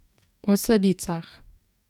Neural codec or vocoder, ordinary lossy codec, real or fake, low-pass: codec, 44.1 kHz, 2.6 kbps, DAC; none; fake; 19.8 kHz